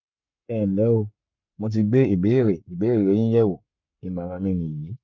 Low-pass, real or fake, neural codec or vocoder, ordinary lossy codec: 7.2 kHz; fake; codec, 44.1 kHz, 7.8 kbps, Pupu-Codec; none